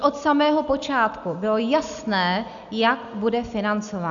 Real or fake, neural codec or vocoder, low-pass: real; none; 7.2 kHz